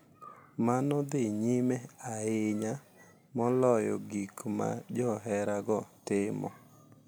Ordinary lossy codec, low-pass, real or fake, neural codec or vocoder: none; none; real; none